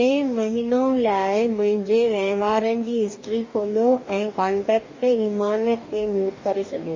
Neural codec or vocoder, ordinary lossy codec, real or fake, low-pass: codec, 44.1 kHz, 2.6 kbps, DAC; MP3, 32 kbps; fake; 7.2 kHz